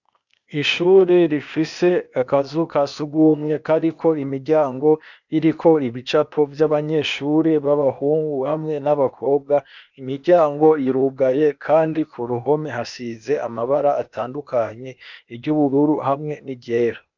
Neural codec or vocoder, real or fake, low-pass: codec, 16 kHz, 0.8 kbps, ZipCodec; fake; 7.2 kHz